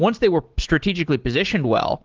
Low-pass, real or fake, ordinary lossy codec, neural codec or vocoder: 7.2 kHz; real; Opus, 16 kbps; none